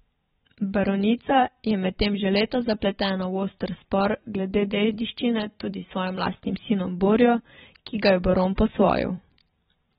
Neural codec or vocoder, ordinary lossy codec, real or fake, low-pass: vocoder, 44.1 kHz, 128 mel bands every 512 samples, BigVGAN v2; AAC, 16 kbps; fake; 19.8 kHz